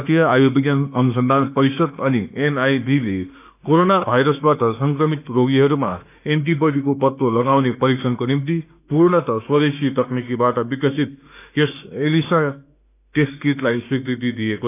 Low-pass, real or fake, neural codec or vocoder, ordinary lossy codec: 3.6 kHz; fake; autoencoder, 48 kHz, 32 numbers a frame, DAC-VAE, trained on Japanese speech; none